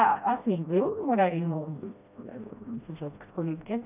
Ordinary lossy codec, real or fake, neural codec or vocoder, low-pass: none; fake; codec, 16 kHz, 1 kbps, FreqCodec, smaller model; 3.6 kHz